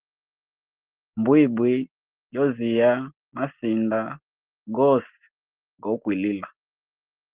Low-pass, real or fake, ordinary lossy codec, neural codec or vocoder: 3.6 kHz; real; Opus, 16 kbps; none